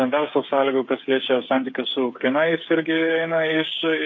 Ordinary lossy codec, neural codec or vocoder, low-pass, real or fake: AAC, 48 kbps; codec, 16 kHz, 8 kbps, FreqCodec, smaller model; 7.2 kHz; fake